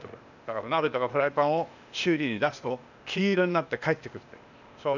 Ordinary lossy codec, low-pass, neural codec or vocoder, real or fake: none; 7.2 kHz; codec, 16 kHz, 0.8 kbps, ZipCodec; fake